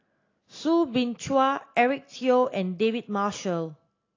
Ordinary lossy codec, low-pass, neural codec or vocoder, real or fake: AAC, 32 kbps; 7.2 kHz; none; real